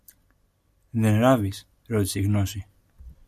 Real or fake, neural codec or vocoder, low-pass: real; none; 14.4 kHz